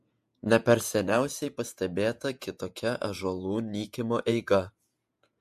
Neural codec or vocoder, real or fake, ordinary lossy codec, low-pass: vocoder, 48 kHz, 128 mel bands, Vocos; fake; MP3, 64 kbps; 14.4 kHz